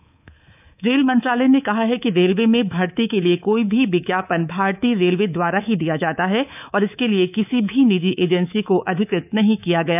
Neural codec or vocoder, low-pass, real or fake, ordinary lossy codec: codec, 24 kHz, 3.1 kbps, DualCodec; 3.6 kHz; fake; none